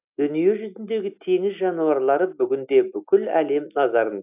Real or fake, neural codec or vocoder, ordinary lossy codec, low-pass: real; none; AAC, 32 kbps; 3.6 kHz